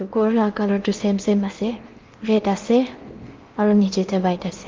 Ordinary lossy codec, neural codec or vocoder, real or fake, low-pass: Opus, 32 kbps; codec, 16 kHz in and 24 kHz out, 0.8 kbps, FocalCodec, streaming, 65536 codes; fake; 7.2 kHz